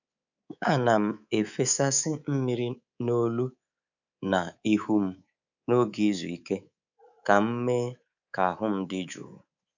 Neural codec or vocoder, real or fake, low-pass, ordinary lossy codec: codec, 24 kHz, 3.1 kbps, DualCodec; fake; 7.2 kHz; none